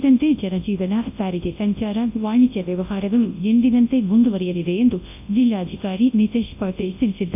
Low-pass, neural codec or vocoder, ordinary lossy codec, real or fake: 3.6 kHz; codec, 24 kHz, 0.9 kbps, WavTokenizer, large speech release; AAC, 32 kbps; fake